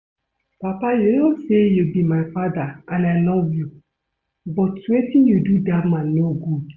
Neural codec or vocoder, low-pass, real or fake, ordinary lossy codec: none; 7.2 kHz; real; Opus, 64 kbps